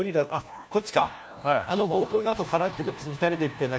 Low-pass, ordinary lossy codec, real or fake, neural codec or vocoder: none; none; fake; codec, 16 kHz, 1 kbps, FunCodec, trained on LibriTTS, 50 frames a second